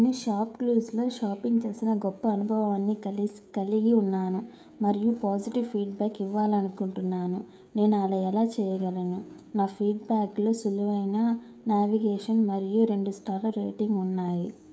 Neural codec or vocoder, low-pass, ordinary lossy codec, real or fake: codec, 16 kHz, 16 kbps, FreqCodec, smaller model; none; none; fake